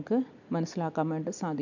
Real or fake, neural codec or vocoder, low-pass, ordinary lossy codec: real; none; 7.2 kHz; none